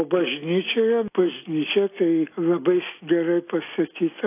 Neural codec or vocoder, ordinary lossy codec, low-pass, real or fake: none; AAC, 24 kbps; 3.6 kHz; real